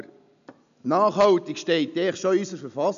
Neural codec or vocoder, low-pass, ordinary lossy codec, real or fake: none; 7.2 kHz; none; real